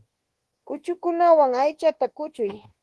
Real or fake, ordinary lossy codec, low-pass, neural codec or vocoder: fake; Opus, 16 kbps; 10.8 kHz; codec, 24 kHz, 1.2 kbps, DualCodec